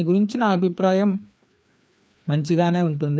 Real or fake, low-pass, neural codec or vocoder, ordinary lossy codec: fake; none; codec, 16 kHz, 2 kbps, FreqCodec, larger model; none